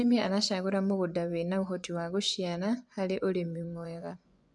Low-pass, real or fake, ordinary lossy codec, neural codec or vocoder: 10.8 kHz; fake; MP3, 96 kbps; vocoder, 24 kHz, 100 mel bands, Vocos